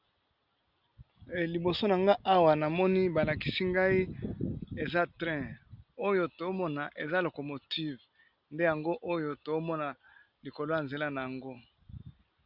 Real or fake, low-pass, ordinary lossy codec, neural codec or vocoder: real; 5.4 kHz; Opus, 64 kbps; none